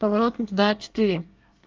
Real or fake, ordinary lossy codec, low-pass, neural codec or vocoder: fake; Opus, 32 kbps; 7.2 kHz; codec, 24 kHz, 1 kbps, SNAC